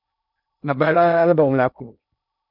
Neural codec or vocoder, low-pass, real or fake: codec, 16 kHz in and 24 kHz out, 0.6 kbps, FocalCodec, streaming, 2048 codes; 5.4 kHz; fake